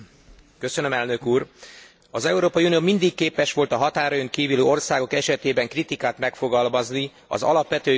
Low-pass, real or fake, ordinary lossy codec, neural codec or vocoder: none; real; none; none